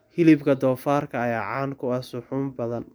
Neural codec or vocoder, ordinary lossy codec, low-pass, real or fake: none; none; none; real